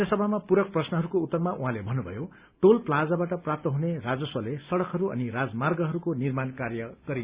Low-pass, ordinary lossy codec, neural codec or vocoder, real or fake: 3.6 kHz; Opus, 64 kbps; none; real